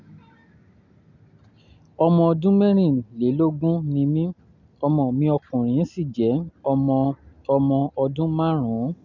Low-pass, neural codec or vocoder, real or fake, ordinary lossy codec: 7.2 kHz; none; real; none